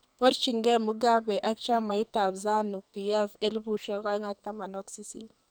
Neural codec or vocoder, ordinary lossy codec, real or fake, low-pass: codec, 44.1 kHz, 2.6 kbps, SNAC; none; fake; none